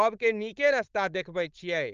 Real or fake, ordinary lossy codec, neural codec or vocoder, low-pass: fake; Opus, 24 kbps; codec, 16 kHz, 4.8 kbps, FACodec; 7.2 kHz